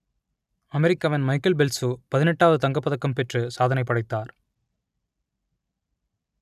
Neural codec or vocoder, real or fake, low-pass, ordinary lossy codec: none; real; 14.4 kHz; none